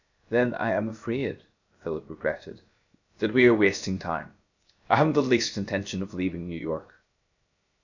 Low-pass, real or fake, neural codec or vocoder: 7.2 kHz; fake; codec, 16 kHz, 0.7 kbps, FocalCodec